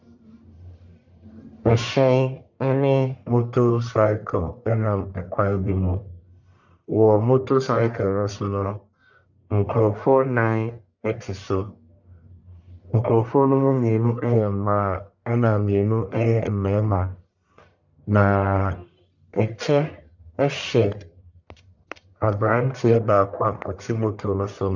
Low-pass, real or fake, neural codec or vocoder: 7.2 kHz; fake; codec, 44.1 kHz, 1.7 kbps, Pupu-Codec